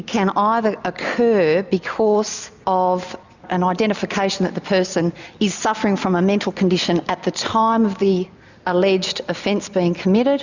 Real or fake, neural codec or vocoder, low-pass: real; none; 7.2 kHz